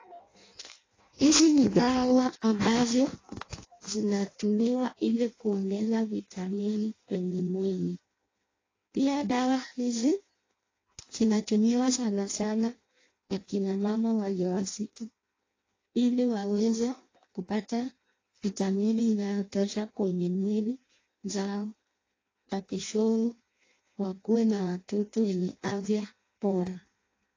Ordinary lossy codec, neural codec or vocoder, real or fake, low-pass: AAC, 32 kbps; codec, 16 kHz in and 24 kHz out, 0.6 kbps, FireRedTTS-2 codec; fake; 7.2 kHz